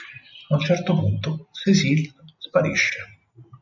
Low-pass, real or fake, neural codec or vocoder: 7.2 kHz; real; none